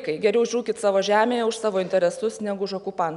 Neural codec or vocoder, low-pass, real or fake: none; 10.8 kHz; real